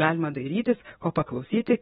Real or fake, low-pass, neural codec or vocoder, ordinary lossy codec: fake; 10.8 kHz; vocoder, 24 kHz, 100 mel bands, Vocos; AAC, 16 kbps